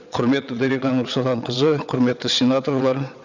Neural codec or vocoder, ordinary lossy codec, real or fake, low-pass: vocoder, 22.05 kHz, 80 mel bands, WaveNeXt; none; fake; 7.2 kHz